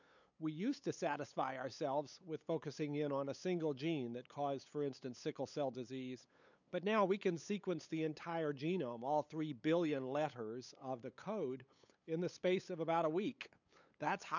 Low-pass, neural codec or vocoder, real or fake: 7.2 kHz; codec, 16 kHz, 8 kbps, FunCodec, trained on LibriTTS, 25 frames a second; fake